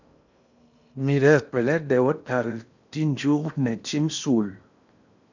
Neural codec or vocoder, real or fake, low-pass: codec, 16 kHz in and 24 kHz out, 0.8 kbps, FocalCodec, streaming, 65536 codes; fake; 7.2 kHz